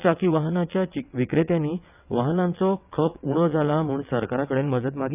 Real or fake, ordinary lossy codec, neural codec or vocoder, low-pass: fake; none; vocoder, 22.05 kHz, 80 mel bands, WaveNeXt; 3.6 kHz